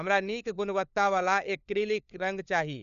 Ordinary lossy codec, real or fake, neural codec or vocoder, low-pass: none; fake; codec, 16 kHz, 4 kbps, FunCodec, trained on LibriTTS, 50 frames a second; 7.2 kHz